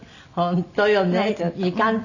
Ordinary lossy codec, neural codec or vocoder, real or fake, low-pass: none; none; real; 7.2 kHz